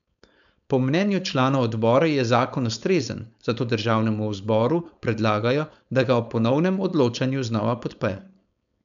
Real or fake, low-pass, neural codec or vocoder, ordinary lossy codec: fake; 7.2 kHz; codec, 16 kHz, 4.8 kbps, FACodec; none